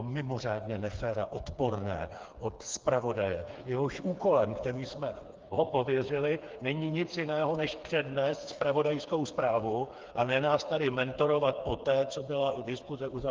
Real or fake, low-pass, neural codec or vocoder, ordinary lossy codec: fake; 7.2 kHz; codec, 16 kHz, 4 kbps, FreqCodec, smaller model; Opus, 32 kbps